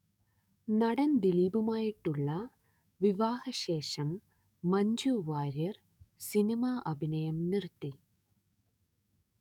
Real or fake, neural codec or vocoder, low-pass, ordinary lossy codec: fake; codec, 44.1 kHz, 7.8 kbps, DAC; 19.8 kHz; none